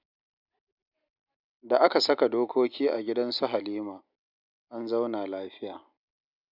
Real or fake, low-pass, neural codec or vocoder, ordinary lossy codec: real; 5.4 kHz; none; none